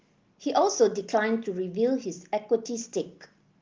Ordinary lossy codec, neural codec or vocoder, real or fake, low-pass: Opus, 32 kbps; none; real; 7.2 kHz